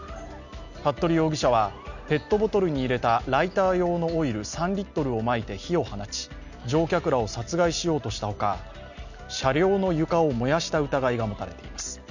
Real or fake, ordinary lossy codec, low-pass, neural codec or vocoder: real; none; 7.2 kHz; none